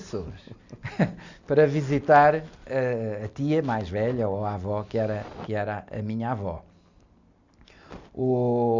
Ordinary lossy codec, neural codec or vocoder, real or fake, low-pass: Opus, 64 kbps; none; real; 7.2 kHz